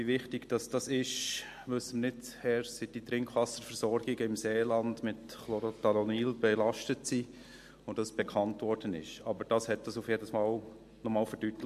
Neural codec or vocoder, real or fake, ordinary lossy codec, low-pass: none; real; MP3, 64 kbps; 14.4 kHz